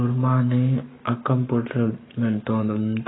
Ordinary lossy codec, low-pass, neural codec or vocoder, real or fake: AAC, 16 kbps; 7.2 kHz; codec, 44.1 kHz, 7.8 kbps, Pupu-Codec; fake